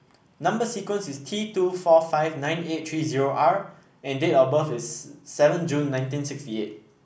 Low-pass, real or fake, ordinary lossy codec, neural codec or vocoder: none; real; none; none